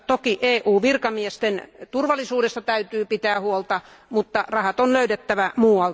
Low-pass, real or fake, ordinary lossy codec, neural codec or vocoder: none; real; none; none